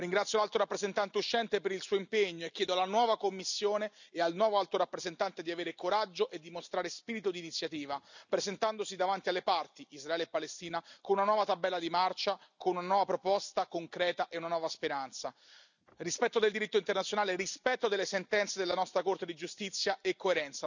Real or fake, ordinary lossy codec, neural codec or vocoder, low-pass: real; none; none; 7.2 kHz